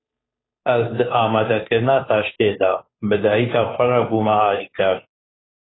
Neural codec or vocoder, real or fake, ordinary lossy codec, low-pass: codec, 16 kHz, 2 kbps, FunCodec, trained on Chinese and English, 25 frames a second; fake; AAC, 16 kbps; 7.2 kHz